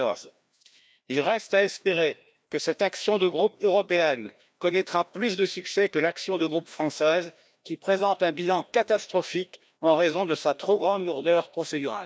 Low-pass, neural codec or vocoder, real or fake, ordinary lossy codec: none; codec, 16 kHz, 1 kbps, FreqCodec, larger model; fake; none